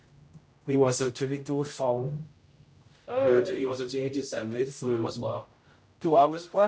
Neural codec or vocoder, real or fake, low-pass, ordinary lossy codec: codec, 16 kHz, 0.5 kbps, X-Codec, HuBERT features, trained on general audio; fake; none; none